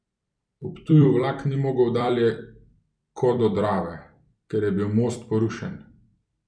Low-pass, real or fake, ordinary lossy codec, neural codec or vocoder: 9.9 kHz; fake; none; vocoder, 44.1 kHz, 128 mel bands every 256 samples, BigVGAN v2